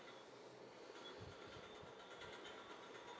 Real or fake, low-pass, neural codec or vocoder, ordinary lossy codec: real; none; none; none